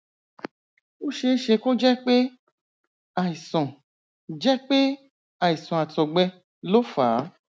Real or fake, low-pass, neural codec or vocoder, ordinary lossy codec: real; none; none; none